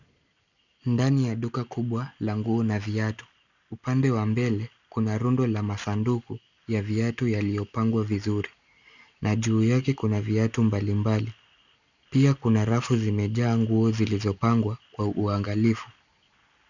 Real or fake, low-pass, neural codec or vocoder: real; 7.2 kHz; none